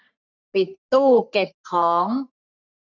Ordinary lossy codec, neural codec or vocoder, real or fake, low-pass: none; codec, 44.1 kHz, 3.4 kbps, Pupu-Codec; fake; 7.2 kHz